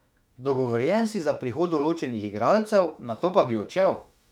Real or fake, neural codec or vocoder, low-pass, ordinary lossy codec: fake; autoencoder, 48 kHz, 32 numbers a frame, DAC-VAE, trained on Japanese speech; 19.8 kHz; none